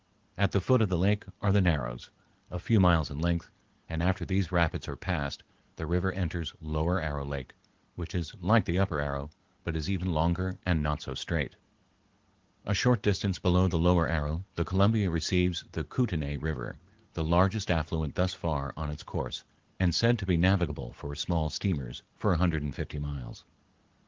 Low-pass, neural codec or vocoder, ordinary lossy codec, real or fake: 7.2 kHz; none; Opus, 16 kbps; real